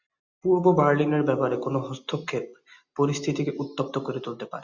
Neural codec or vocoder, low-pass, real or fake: none; 7.2 kHz; real